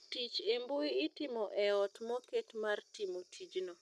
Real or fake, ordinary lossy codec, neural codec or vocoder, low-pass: real; none; none; none